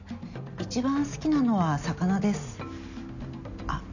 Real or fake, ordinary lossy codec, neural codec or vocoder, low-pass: real; none; none; 7.2 kHz